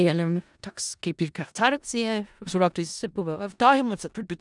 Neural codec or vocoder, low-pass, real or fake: codec, 16 kHz in and 24 kHz out, 0.4 kbps, LongCat-Audio-Codec, four codebook decoder; 10.8 kHz; fake